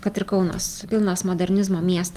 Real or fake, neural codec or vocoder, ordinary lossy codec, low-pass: real; none; Opus, 32 kbps; 14.4 kHz